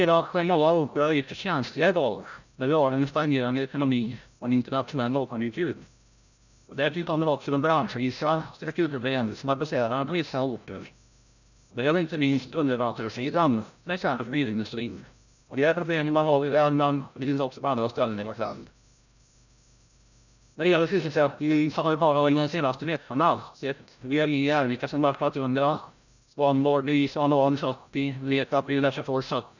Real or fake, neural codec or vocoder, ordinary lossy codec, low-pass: fake; codec, 16 kHz, 0.5 kbps, FreqCodec, larger model; none; 7.2 kHz